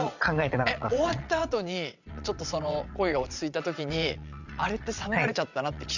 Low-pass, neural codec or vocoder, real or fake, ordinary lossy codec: 7.2 kHz; vocoder, 22.05 kHz, 80 mel bands, WaveNeXt; fake; none